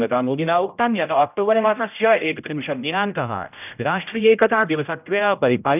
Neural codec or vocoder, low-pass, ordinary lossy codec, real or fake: codec, 16 kHz, 0.5 kbps, X-Codec, HuBERT features, trained on general audio; 3.6 kHz; none; fake